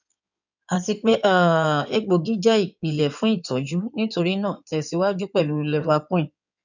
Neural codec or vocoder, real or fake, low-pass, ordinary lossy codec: codec, 16 kHz in and 24 kHz out, 2.2 kbps, FireRedTTS-2 codec; fake; 7.2 kHz; none